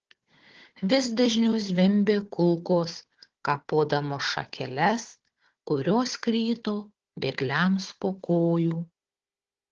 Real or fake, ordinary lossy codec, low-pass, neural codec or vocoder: fake; Opus, 16 kbps; 7.2 kHz; codec, 16 kHz, 4 kbps, FunCodec, trained on Chinese and English, 50 frames a second